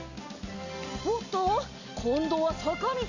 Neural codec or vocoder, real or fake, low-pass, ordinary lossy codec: none; real; 7.2 kHz; none